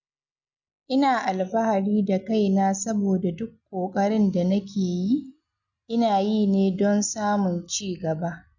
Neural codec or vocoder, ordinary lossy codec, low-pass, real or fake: none; none; 7.2 kHz; real